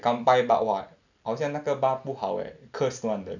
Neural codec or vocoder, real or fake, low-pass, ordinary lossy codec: none; real; 7.2 kHz; none